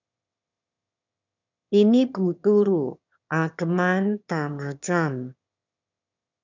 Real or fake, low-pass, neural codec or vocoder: fake; 7.2 kHz; autoencoder, 22.05 kHz, a latent of 192 numbers a frame, VITS, trained on one speaker